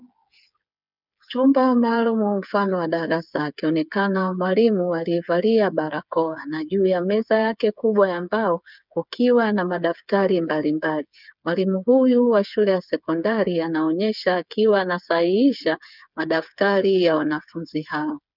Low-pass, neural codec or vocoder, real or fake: 5.4 kHz; codec, 16 kHz, 8 kbps, FreqCodec, smaller model; fake